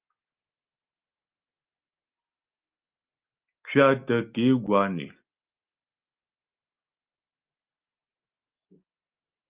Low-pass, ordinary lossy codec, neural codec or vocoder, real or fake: 3.6 kHz; Opus, 32 kbps; none; real